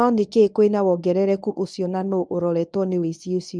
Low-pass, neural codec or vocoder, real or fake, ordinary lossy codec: 9.9 kHz; codec, 24 kHz, 0.9 kbps, WavTokenizer, medium speech release version 1; fake; none